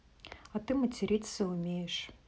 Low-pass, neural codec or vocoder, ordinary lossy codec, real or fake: none; none; none; real